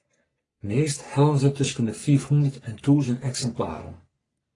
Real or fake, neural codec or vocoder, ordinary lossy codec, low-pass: fake; codec, 44.1 kHz, 3.4 kbps, Pupu-Codec; AAC, 32 kbps; 10.8 kHz